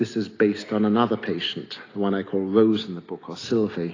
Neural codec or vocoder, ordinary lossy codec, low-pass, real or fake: none; AAC, 32 kbps; 7.2 kHz; real